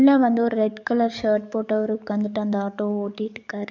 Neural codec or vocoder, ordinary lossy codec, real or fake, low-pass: codec, 44.1 kHz, 7.8 kbps, DAC; none; fake; 7.2 kHz